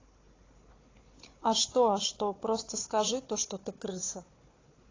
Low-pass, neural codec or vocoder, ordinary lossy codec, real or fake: 7.2 kHz; codec, 16 kHz, 4 kbps, FunCodec, trained on Chinese and English, 50 frames a second; AAC, 32 kbps; fake